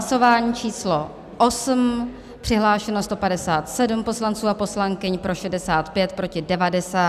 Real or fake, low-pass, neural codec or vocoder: real; 14.4 kHz; none